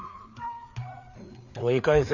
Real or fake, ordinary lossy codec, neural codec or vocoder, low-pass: fake; none; codec, 16 kHz, 4 kbps, FreqCodec, larger model; 7.2 kHz